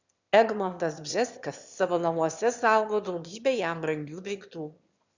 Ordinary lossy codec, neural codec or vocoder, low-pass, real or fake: Opus, 64 kbps; autoencoder, 22.05 kHz, a latent of 192 numbers a frame, VITS, trained on one speaker; 7.2 kHz; fake